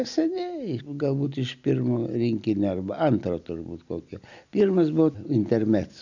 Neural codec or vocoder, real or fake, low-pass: none; real; 7.2 kHz